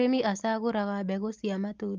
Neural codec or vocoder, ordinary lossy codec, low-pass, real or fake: none; Opus, 24 kbps; 7.2 kHz; real